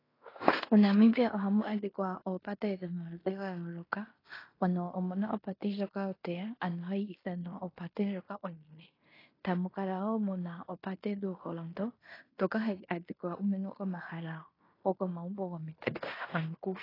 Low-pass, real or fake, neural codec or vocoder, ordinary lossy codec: 5.4 kHz; fake; codec, 16 kHz in and 24 kHz out, 0.9 kbps, LongCat-Audio-Codec, fine tuned four codebook decoder; AAC, 24 kbps